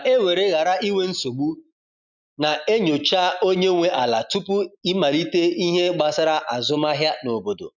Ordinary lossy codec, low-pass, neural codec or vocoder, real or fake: none; 7.2 kHz; none; real